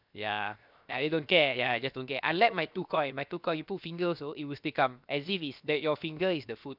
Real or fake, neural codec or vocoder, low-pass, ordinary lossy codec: fake; codec, 16 kHz, 0.7 kbps, FocalCodec; 5.4 kHz; none